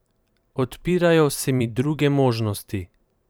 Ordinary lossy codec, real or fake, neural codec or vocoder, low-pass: none; fake; vocoder, 44.1 kHz, 128 mel bands every 512 samples, BigVGAN v2; none